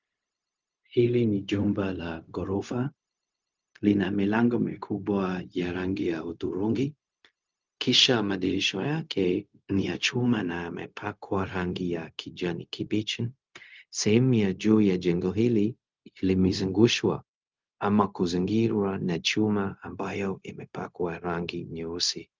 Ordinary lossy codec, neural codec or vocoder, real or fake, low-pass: Opus, 24 kbps; codec, 16 kHz, 0.4 kbps, LongCat-Audio-Codec; fake; 7.2 kHz